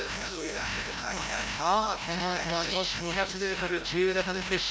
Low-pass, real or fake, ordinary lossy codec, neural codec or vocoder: none; fake; none; codec, 16 kHz, 0.5 kbps, FreqCodec, larger model